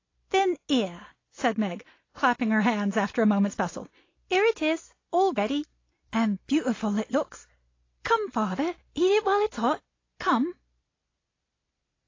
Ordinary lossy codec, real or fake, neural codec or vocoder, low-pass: AAC, 32 kbps; real; none; 7.2 kHz